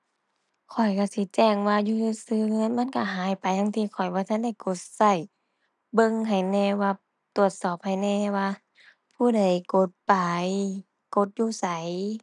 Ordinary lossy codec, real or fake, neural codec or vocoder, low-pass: none; real; none; 10.8 kHz